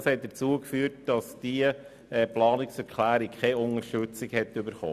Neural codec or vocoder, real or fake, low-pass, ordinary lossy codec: none; real; 14.4 kHz; none